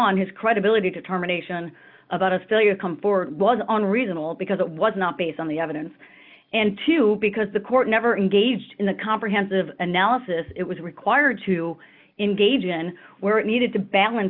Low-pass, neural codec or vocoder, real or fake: 5.4 kHz; none; real